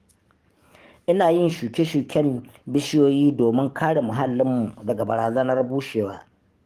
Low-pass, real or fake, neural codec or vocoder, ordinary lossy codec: 14.4 kHz; fake; codec, 44.1 kHz, 7.8 kbps, DAC; Opus, 24 kbps